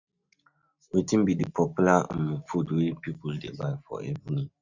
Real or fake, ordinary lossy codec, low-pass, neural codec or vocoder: real; none; 7.2 kHz; none